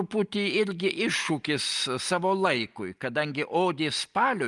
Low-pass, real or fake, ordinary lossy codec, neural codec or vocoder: 10.8 kHz; real; Opus, 32 kbps; none